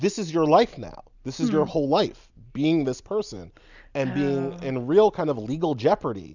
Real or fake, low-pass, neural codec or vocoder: real; 7.2 kHz; none